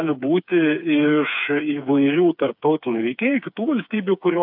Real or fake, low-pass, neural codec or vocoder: fake; 5.4 kHz; codec, 16 kHz, 4 kbps, FreqCodec, smaller model